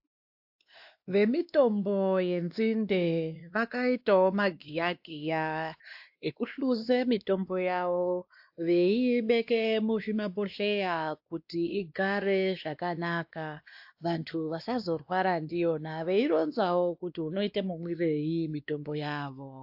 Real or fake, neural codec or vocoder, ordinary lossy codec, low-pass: fake; codec, 16 kHz, 2 kbps, X-Codec, WavLM features, trained on Multilingual LibriSpeech; AAC, 48 kbps; 5.4 kHz